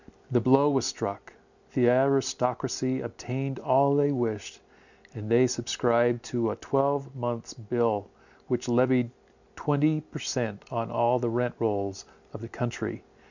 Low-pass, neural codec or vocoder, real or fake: 7.2 kHz; none; real